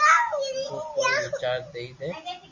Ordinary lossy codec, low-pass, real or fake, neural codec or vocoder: MP3, 64 kbps; 7.2 kHz; real; none